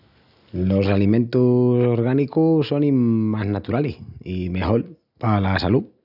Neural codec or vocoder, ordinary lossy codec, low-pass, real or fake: none; none; 5.4 kHz; real